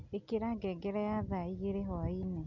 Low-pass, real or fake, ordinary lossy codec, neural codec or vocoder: 7.2 kHz; real; none; none